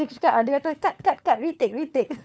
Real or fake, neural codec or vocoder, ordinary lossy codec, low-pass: fake; codec, 16 kHz, 4 kbps, FunCodec, trained on LibriTTS, 50 frames a second; none; none